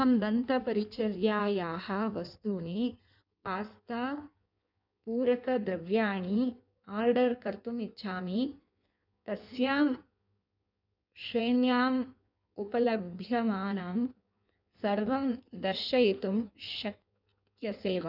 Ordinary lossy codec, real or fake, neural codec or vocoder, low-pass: none; fake; codec, 16 kHz in and 24 kHz out, 1.1 kbps, FireRedTTS-2 codec; 5.4 kHz